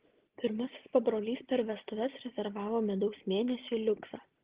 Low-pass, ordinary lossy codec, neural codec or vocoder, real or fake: 3.6 kHz; Opus, 16 kbps; none; real